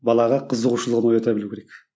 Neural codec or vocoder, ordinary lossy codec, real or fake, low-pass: none; none; real; none